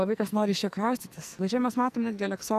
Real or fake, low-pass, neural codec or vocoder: fake; 14.4 kHz; codec, 44.1 kHz, 2.6 kbps, SNAC